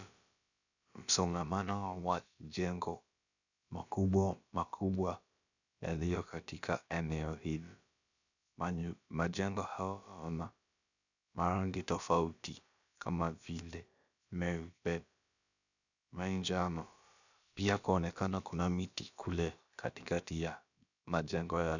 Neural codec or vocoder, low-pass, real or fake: codec, 16 kHz, about 1 kbps, DyCAST, with the encoder's durations; 7.2 kHz; fake